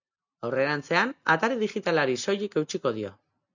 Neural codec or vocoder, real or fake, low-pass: none; real; 7.2 kHz